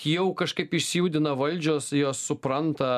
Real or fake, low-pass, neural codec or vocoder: real; 14.4 kHz; none